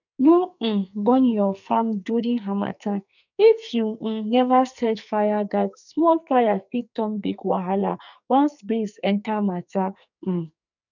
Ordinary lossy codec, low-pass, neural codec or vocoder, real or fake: none; 7.2 kHz; codec, 44.1 kHz, 2.6 kbps, SNAC; fake